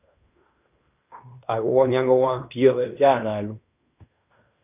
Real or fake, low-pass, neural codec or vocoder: fake; 3.6 kHz; codec, 16 kHz in and 24 kHz out, 0.9 kbps, LongCat-Audio-Codec, fine tuned four codebook decoder